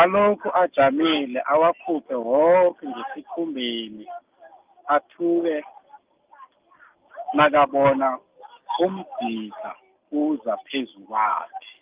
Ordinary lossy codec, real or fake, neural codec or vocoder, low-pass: Opus, 24 kbps; real; none; 3.6 kHz